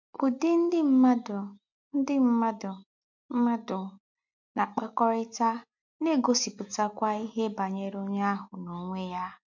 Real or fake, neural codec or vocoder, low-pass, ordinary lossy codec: real; none; 7.2 kHz; MP3, 48 kbps